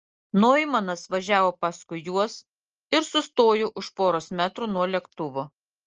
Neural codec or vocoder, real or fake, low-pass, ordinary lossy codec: none; real; 7.2 kHz; Opus, 24 kbps